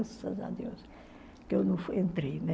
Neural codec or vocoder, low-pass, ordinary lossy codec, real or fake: none; none; none; real